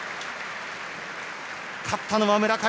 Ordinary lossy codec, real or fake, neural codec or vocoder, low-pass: none; real; none; none